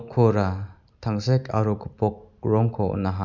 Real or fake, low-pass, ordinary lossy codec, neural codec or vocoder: real; 7.2 kHz; none; none